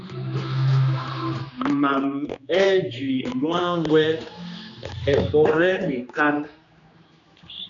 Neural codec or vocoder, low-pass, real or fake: codec, 16 kHz, 2 kbps, X-Codec, HuBERT features, trained on general audio; 7.2 kHz; fake